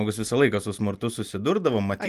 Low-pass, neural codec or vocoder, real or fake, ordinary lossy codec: 14.4 kHz; none; real; Opus, 32 kbps